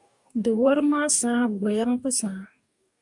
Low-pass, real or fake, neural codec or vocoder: 10.8 kHz; fake; codec, 44.1 kHz, 2.6 kbps, DAC